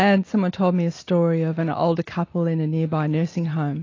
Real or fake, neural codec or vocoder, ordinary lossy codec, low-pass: real; none; AAC, 32 kbps; 7.2 kHz